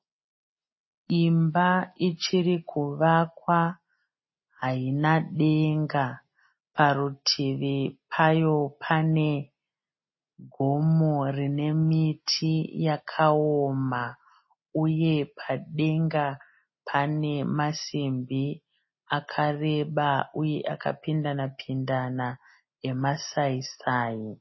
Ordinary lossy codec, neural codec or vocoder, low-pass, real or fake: MP3, 24 kbps; none; 7.2 kHz; real